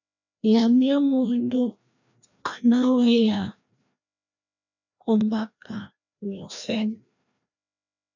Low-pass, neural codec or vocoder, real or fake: 7.2 kHz; codec, 16 kHz, 1 kbps, FreqCodec, larger model; fake